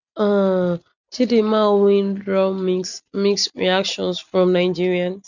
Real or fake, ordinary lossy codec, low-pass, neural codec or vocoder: real; none; 7.2 kHz; none